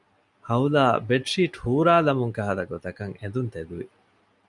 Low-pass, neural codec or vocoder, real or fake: 10.8 kHz; none; real